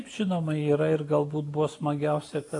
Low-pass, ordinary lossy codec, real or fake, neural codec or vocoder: 10.8 kHz; AAC, 48 kbps; real; none